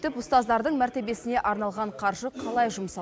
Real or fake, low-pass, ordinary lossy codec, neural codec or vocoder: real; none; none; none